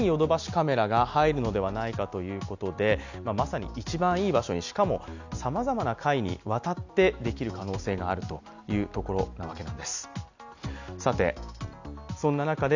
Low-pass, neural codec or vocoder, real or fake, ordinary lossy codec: 7.2 kHz; none; real; none